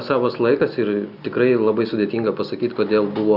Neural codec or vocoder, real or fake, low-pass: none; real; 5.4 kHz